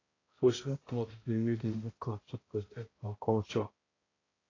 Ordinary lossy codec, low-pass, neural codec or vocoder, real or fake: AAC, 32 kbps; 7.2 kHz; codec, 16 kHz, 0.5 kbps, X-Codec, HuBERT features, trained on balanced general audio; fake